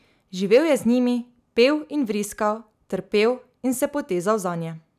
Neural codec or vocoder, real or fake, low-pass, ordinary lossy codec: none; real; 14.4 kHz; none